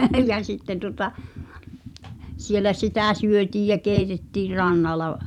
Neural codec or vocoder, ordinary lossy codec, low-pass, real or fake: vocoder, 44.1 kHz, 128 mel bands every 512 samples, BigVGAN v2; none; 19.8 kHz; fake